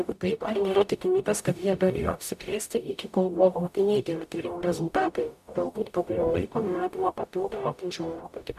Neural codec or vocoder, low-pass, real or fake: codec, 44.1 kHz, 0.9 kbps, DAC; 14.4 kHz; fake